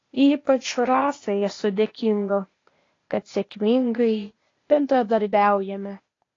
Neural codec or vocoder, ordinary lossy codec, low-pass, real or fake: codec, 16 kHz, 0.8 kbps, ZipCodec; AAC, 32 kbps; 7.2 kHz; fake